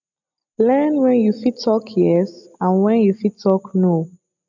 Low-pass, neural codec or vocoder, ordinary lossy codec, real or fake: 7.2 kHz; none; none; real